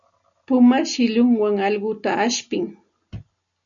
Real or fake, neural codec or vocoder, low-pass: real; none; 7.2 kHz